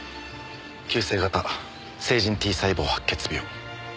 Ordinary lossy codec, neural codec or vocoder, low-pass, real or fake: none; none; none; real